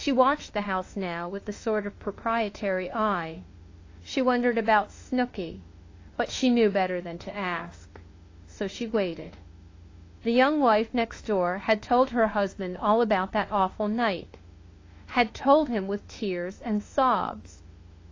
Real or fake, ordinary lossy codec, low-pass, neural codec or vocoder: fake; AAC, 32 kbps; 7.2 kHz; autoencoder, 48 kHz, 32 numbers a frame, DAC-VAE, trained on Japanese speech